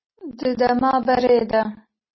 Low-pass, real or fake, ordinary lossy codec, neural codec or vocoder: 7.2 kHz; real; MP3, 24 kbps; none